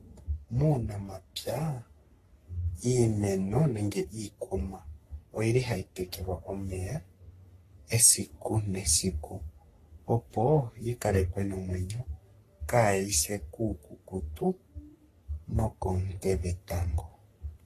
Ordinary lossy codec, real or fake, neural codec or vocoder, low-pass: AAC, 48 kbps; fake; codec, 44.1 kHz, 3.4 kbps, Pupu-Codec; 14.4 kHz